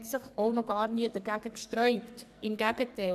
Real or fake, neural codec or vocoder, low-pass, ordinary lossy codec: fake; codec, 44.1 kHz, 2.6 kbps, SNAC; 14.4 kHz; none